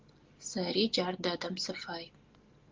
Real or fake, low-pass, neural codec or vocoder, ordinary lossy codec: real; 7.2 kHz; none; Opus, 16 kbps